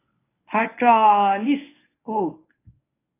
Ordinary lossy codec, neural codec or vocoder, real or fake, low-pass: AAC, 16 kbps; codec, 24 kHz, 0.9 kbps, WavTokenizer, medium speech release version 1; fake; 3.6 kHz